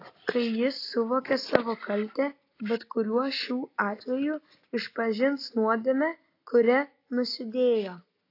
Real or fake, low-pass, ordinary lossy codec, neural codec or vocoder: real; 5.4 kHz; AAC, 32 kbps; none